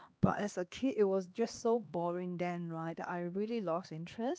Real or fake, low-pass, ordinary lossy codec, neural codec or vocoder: fake; none; none; codec, 16 kHz, 2 kbps, X-Codec, HuBERT features, trained on LibriSpeech